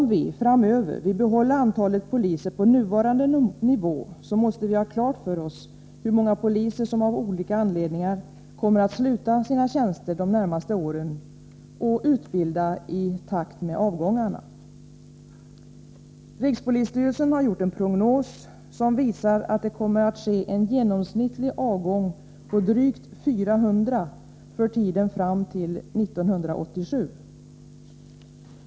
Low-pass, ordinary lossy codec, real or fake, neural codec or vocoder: none; none; real; none